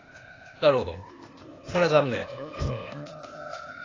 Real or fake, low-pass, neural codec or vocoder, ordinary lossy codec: fake; 7.2 kHz; codec, 16 kHz, 0.8 kbps, ZipCodec; AAC, 32 kbps